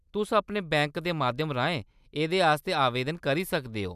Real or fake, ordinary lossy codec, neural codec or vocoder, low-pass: real; none; none; 14.4 kHz